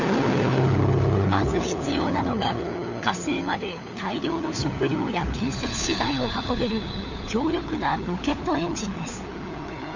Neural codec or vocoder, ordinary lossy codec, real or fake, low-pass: codec, 16 kHz, 4 kbps, FunCodec, trained on LibriTTS, 50 frames a second; none; fake; 7.2 kHz